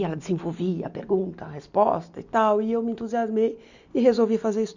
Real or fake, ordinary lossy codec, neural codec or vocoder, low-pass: real; none; none; 7.2 kHz